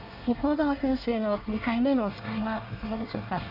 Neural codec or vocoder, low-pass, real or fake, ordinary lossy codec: codec, 24 kHz, 1 kbps, SNAC; 5.4 kHz; fake; none